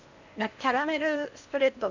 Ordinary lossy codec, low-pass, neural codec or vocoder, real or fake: none; 7.2 kHz; codec, 16 kHz in and 24 kHz out, 0.8 kbps, FocalCodec, streaming, 65536 codes; fake